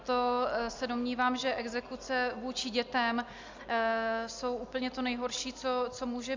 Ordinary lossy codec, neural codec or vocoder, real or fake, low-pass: MP3, 64 kbps; none; real; 7.2 kHz